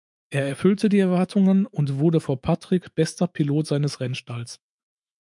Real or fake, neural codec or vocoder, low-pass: fake; autoencoder, 48 kHz, 128 numbers a frame, DAC-VAE, trained on Japanese speech; 10.8 kHz